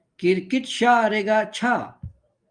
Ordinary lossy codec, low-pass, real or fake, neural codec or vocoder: Opus, 32 kbps; 9.9 kHz; real; none